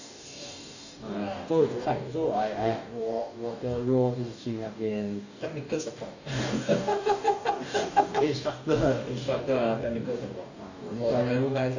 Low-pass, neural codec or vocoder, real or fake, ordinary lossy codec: 7.2 kHz; codec, 44.1 kHz, 2.6 kbps, DAC; fake; none